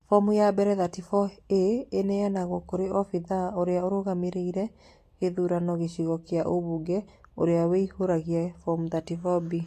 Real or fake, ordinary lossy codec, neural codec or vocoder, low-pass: real; AAC, 48 kbps; none; 14.4 kHz